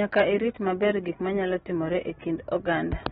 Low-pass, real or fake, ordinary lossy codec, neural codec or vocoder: 19.8 kHz; real; AAC, 16 kbps; none